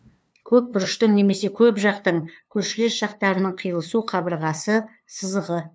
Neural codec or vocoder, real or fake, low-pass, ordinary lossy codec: codec, 16 kHz, 2 kbps, FunCodec, trained on LibriTTS, 25 frames a second; fake; none; none